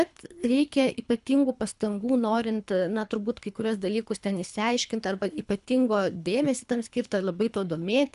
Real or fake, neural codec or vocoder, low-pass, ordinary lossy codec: fake; codec, 24 kHz, 3 kbps, HILCodec; 10.8 kHz; AAC, 96 kbps